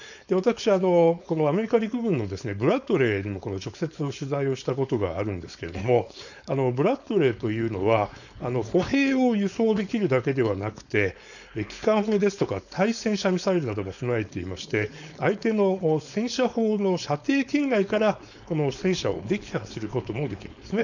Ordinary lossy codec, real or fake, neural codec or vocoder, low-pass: none; fake; codec, 16 kHz, 4.8 kbps, FACodec; 7.2 kHz